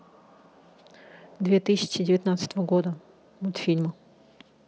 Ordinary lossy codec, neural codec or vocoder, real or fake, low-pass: none; none; real; none